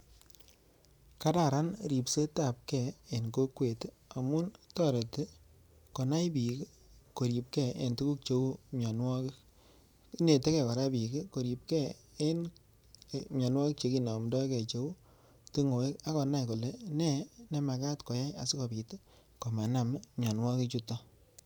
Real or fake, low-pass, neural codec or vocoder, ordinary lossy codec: fake; none; vocoder, 44.1 kHz, 128 mel bands every 512 samples, BigVGAN v2; none